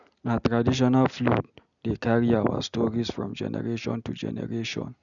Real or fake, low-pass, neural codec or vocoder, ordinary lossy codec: real; 7.2 kHz; none; none